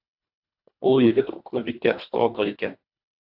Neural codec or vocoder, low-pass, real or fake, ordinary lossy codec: codec, 24 kHz, 1.5 kbps, HILCodec; 5.4 kHz; fake; AAC, 48 kbps